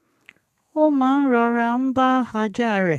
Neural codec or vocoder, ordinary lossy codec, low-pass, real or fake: codec, 32 kHz, 1.9 kbps, SNAC; none; 14.4 kHz; fake